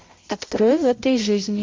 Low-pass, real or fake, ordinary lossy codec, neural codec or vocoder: 7.2 kHz; fake; Opus, 32 kbps; codec, 16 kHz, 0.5 kbps, X-Codec, HuBERT features, trained on balanced general audio